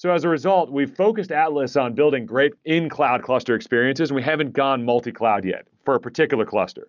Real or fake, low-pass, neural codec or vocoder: real; 7.2 kHz; none